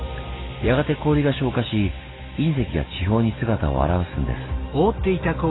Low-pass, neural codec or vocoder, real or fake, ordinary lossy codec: 7.2 kHz; none; real; AAC, 16 kbps